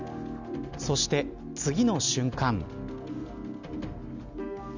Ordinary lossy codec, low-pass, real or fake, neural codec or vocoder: none; 7.2 kHz; real; none